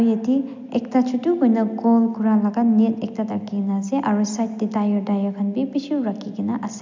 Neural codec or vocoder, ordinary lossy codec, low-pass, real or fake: none; MP3, 64 kbps; 7.2 kHz; real